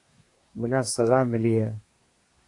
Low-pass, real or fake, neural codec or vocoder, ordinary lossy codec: 10.8 kHz; fake; codec, 24 kHz, 1 kbps, SNAC; AAC, 48 kbps